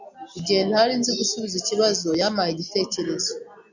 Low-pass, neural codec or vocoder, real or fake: 7.2 kHz; none; real